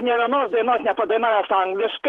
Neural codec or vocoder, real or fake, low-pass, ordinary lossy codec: vocoder, 48 kHz, 128 mel bands, Vocos; fake; 19.8 kHz; Opus, 16 kbps